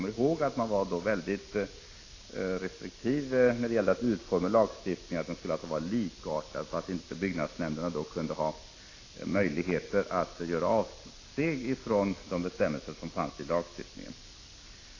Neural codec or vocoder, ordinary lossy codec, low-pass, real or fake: none; AAC, 32 kbps; 7.2 kHz; real